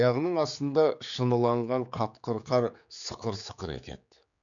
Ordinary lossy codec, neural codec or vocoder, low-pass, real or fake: none; codec, 16 kHz, 4 kbps, X-Codec, HuBERT features, trained on general audio; 7.2 kHz; fake